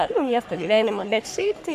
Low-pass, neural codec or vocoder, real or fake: 14.4 kHz; codec, 44.1 kHz, 3.4 kbps, Pupu-Codec; fake